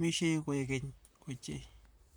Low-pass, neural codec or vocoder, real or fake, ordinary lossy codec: none; vocoder, 44.1 kHz, 128 mel bands, Pupu-Vocoder; fake; none